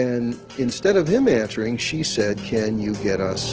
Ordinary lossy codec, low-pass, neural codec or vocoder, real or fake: Opus, 16 kbps; 7.2 kHz; none; real